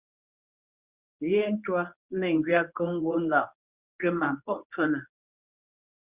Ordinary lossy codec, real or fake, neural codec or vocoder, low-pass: Opus, 64 kbps; fake; codec, 24 kHz, 0.9 kbps, WavTokenizer, medium speech release version 1; 3.6 kHz